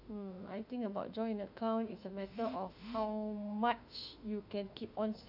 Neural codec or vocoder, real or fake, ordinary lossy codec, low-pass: autoencoder, 48 kHz, 32 numbers a frame, DAC-VAE, trained on Japanese speech; fake; none; 5.4 kHz